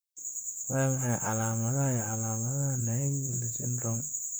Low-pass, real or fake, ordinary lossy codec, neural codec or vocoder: none; fake; none; vocoder, 44.1 kHz, 128 mel bands, Pupu-Vocoder